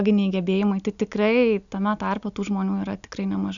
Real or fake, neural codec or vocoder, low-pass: real; none; 7.2 kHz